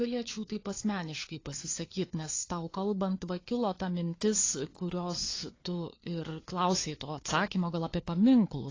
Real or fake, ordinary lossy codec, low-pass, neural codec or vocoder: fake; AAC, 32 kbps; 7.2 kHz; codec, 16 kHz, 4 kbps, FunCodec, trained on LibriTTS, 50 frames a second